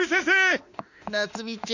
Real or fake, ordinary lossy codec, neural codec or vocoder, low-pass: fake; none; autoencoder, 48 kHz, 32 numbers a frame, DAC-VAE, trained on Japanese speech; 7.2 kHz